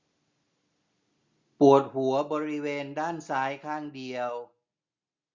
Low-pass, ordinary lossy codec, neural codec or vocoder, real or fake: 7.2 kHz; Opus, 64 kbps; none; real